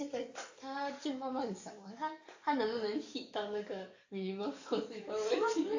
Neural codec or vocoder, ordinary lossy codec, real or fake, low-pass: codec, 44.1 kHz, 7.8 kbps, DAC; AAC, 48 kbps; fake; 7.2 kHz